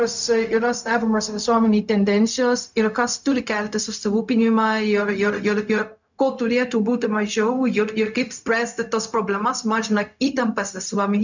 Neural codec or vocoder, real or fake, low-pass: codec, 16 kHz, 0.4 kbps, LongCat-Audio-Codec; fake; 7.2 kHz